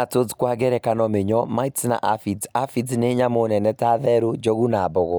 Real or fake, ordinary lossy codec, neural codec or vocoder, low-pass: real; none; none; none